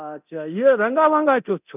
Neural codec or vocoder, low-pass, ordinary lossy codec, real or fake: codec, 24 kHz, 0.9 kbps, DualCodec; 3.6 kHz; none; fake